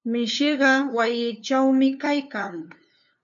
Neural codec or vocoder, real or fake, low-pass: codec, 16 kHz, 2 kbps, FunCodec, trained on LibriTTS, 25 frames a second; fake; 7.2 kHz